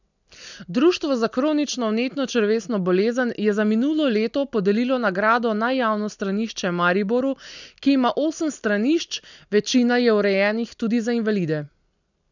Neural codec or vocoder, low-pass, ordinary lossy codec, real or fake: none; 7.2 kHz; none; real